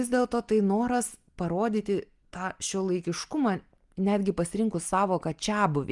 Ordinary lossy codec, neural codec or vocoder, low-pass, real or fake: Opus, 32 kbps; none; 10.8 kHz; real